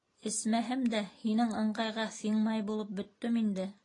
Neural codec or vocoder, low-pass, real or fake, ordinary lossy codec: none; 10.8 kHz; real; AAC, 32 kbps